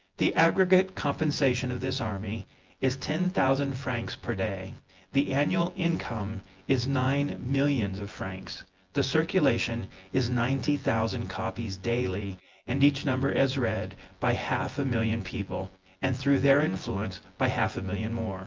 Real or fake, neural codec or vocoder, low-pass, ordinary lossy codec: fake; vocoder, 24 kHz, 100 mel bands, Vocos; 7.2 kHz; Opus, 32 kbps